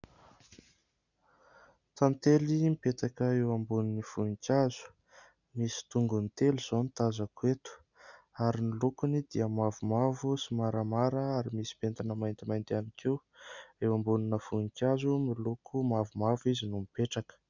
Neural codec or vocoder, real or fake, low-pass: none; real; 7.2 kHz